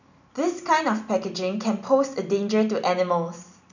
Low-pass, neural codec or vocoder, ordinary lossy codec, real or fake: 7.2 kHz; none; none; real